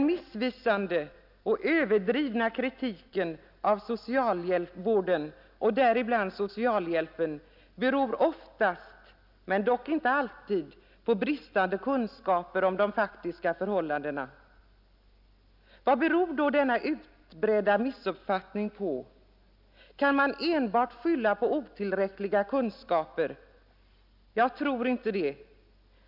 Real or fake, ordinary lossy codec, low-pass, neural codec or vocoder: real; none; 5.4 kHz; none